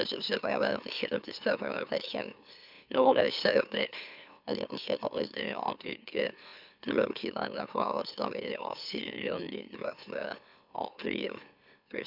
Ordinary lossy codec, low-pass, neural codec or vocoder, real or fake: none; 5.4 kHz; autoencoder, 44.1 kHz, a latent of 192 numbers a frame, MeloTTS; fake